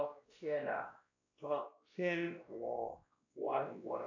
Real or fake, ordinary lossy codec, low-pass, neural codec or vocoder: fake; AAC, 48 kbps; 7.2 kHz; codec, 16 kHz, 1 kbps, X-Codec, WavLM features, trained on Multilingual LibriSpeech